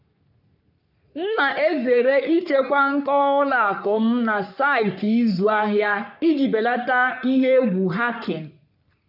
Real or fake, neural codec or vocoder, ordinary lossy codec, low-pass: fake; codec, 44.1 kHz, 3.4 kbps, Pupu-Codec; none; 5.4 kHz